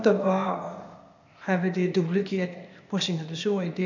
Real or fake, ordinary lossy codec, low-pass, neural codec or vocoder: fake; none; 7.2 kHz; codec, 16 kHz, 0.8 kbps, ZipCodec